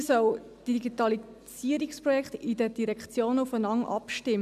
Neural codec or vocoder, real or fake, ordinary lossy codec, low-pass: none; real; none; 14.4 kHz